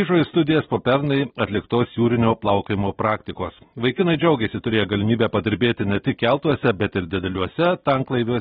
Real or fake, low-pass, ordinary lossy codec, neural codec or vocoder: real; 10.8 kHz; AAC, 16 kbps; none